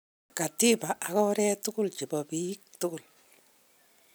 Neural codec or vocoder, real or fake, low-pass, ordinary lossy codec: none; real; none; none